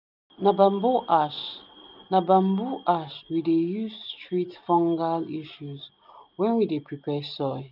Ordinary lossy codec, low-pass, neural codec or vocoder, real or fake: none; 5.4 kHz; none; real